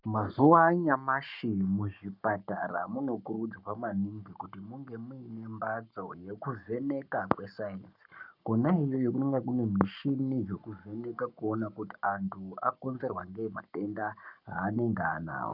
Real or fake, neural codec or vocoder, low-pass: fake; codec, 44.1 kHz, 7.8 kbps, Pupu-Codec; 5.4 kHz